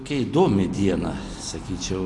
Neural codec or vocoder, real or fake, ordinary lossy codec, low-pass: none; real; AAC, 48 kbps; 10.8 kHz